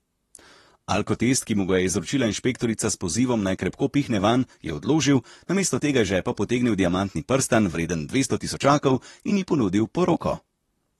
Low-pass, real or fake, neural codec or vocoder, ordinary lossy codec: 19.8 kHz; fake; vocoder, 44.1 kHz, 128 mel bands, Pupu-Vocoder; AAC, 32 kbps